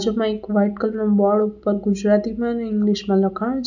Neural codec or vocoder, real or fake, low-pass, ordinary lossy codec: none; real; 7.2 kHz; none